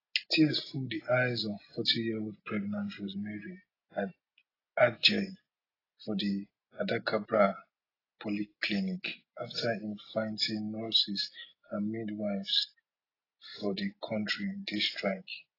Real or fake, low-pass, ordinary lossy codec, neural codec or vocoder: real; 5.4 kHz; AAC, 24 kbps; none